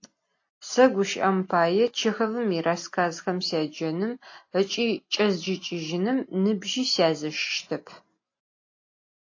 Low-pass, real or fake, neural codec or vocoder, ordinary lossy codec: 7.2 kHz; real; none; AAC, 48 kbps